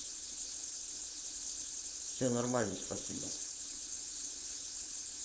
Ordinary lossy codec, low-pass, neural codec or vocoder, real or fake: none; none; codec, 16 kHz, 4.8 kbps, FACodec; fake